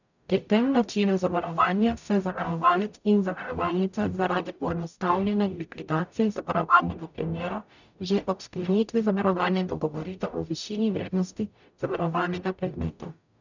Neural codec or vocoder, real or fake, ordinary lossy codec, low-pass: codec, 44.1 kHz, 0.9 kbps, DAC; fake; none; 7.2 kHz